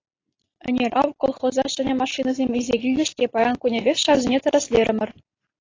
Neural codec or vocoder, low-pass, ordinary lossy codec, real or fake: none; 7.2 kHz; AAC, 32 kbps; real